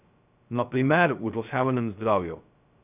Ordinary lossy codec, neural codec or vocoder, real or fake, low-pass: none; codec, 16 kHz, 0.2 kbps, FocalCodec; fake; 3.6 kHz